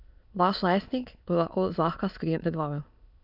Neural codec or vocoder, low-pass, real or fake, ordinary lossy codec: autoencoder, 22.05 kHz, a latent of 192 numbers a frame, VITS, trained on many speakers; 5.4 kHz; fake; none